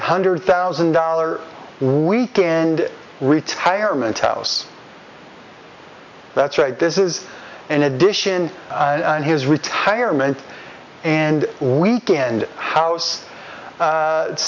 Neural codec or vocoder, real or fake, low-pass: none; real; 7.2 kHz